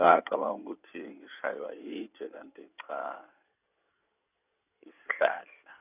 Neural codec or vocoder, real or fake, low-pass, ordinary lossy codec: codec, 16 kHz in and 24 kHz out, 2.2 kbps, FireRedTTS-2 codec; fake; 3.6 kHz; none